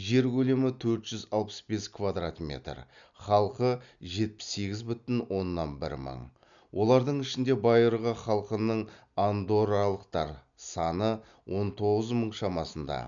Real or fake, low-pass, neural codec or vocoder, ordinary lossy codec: real; 7.2 kHz; none; none